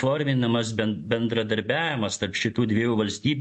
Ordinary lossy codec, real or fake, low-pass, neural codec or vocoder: MP3, 48 kbps; real; 10.8 kHz; none